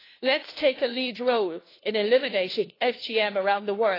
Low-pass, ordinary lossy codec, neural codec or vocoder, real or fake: 5.4 kHz; AAC, 24 kbps; codec, 16 kHz, 1 kbps, FunCodec, trained on LibriTTS, 50 frames a second; fake